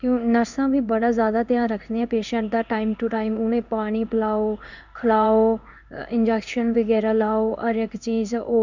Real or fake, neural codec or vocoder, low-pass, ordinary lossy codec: fake; codec, 16 kHz in and 24 kHz out, 1 kbps, XY-Tokenizer; 7.2 kHz; none